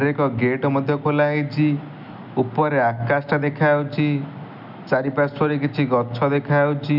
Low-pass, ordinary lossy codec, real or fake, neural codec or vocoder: 5.4 kHz; none; real; none